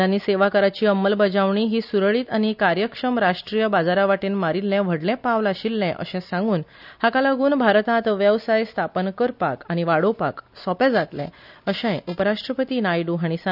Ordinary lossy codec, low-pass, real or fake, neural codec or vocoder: none; 5.4 kHz; real; none